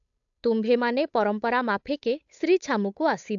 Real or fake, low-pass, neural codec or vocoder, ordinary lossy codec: fake; 7.2 kHz; codec, 16 kHz, 8 kbps, FunCodec, trained on Chinese and English, 25 frames a second; AAC, 64 kbps